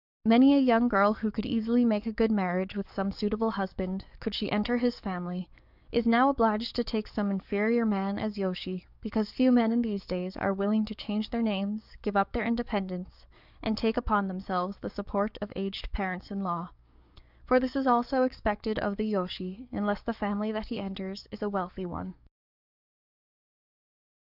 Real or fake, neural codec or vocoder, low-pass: fake; codec, 44.1 kHz, 7.8 kbps, DAC; 5.4 kHz